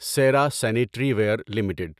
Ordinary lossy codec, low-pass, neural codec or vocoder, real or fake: none; 14.4 kHz; none; real